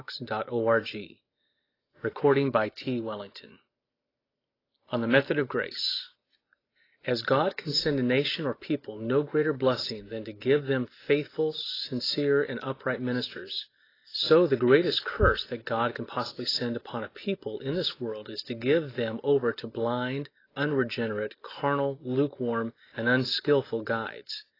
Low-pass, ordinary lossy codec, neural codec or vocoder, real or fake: 5.4 kHz; AAC, 24 kbps; none; real